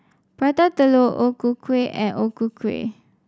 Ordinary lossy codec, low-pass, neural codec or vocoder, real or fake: none; none; none; real